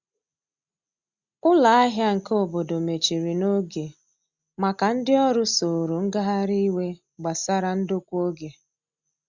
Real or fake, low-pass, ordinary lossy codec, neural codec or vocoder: real; 7.2 kHz; Opus, 64 kbps; none